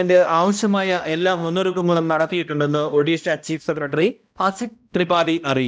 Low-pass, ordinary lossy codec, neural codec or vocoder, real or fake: none; none; codec, 16 kHz, 1 kbps, X-Codec, HuBERT features, trained on balanced general audio; fake